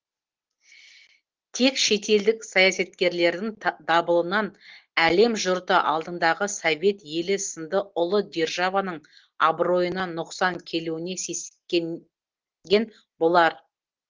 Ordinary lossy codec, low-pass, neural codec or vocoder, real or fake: Opus, 16 kbps; 7.2 kHz; none; real